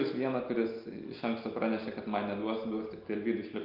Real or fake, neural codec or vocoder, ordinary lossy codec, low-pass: real; none; Opus, 24 kbps; 5.4 kHz